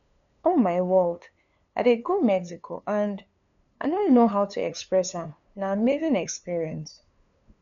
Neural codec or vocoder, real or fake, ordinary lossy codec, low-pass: codec, 16 kHz, 2 kbps, FunCodec, trained on LibriTTS, 25 frames a second; fake; none; 7.2 kHz